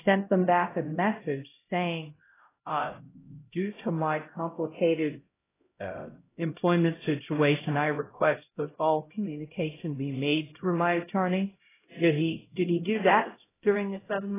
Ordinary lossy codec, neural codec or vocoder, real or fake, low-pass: AAC, 16 kbps; codec, 16 kHz, 0.5 kbps, X-Codec, HuBERT features, trained on LibriSpeech; fake; 3.6 kHz